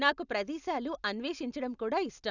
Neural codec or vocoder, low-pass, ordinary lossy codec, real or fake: none; 7.2 kHz; none; real